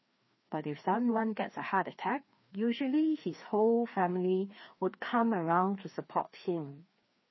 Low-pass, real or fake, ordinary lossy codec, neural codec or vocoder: 7.2 kHz; fake; MP3, 24 kbps; codec, 16 kHz, 2 kbps, FreqCodec, larger model